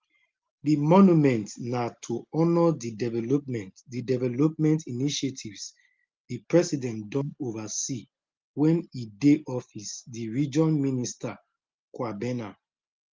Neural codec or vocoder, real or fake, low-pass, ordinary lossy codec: none; real; 7.2 kHz; Opus, 32 kbps